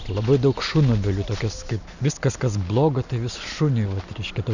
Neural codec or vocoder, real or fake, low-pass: none; real; 7.2 kHz